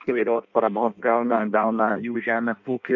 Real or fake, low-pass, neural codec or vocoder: fake; 7.2 kHz; codec, 16 kHz in and 24 kHz out, 0.6 kbps, FireRedTTS-2 codec